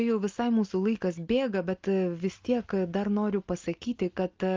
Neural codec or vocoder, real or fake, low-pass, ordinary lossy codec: none; real; 7.2 kHz; Opus, 24 kbps